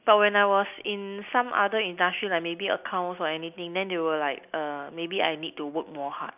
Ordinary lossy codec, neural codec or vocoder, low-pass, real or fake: none; none; 3.6 kHz; real